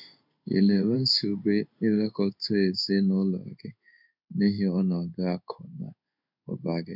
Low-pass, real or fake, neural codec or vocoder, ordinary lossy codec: 5.4 kHz; fake; codec, 16 kHz in and 24 kHz out, 1 kbps, XY-Tokenizer; none